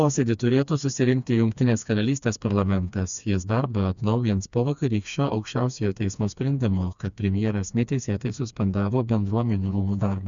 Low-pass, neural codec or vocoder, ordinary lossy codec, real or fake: 7.2 kHz; codec, 16 kHz, 2 kbps, FreqCodec, smaller model; MP3, 96 kbps; fake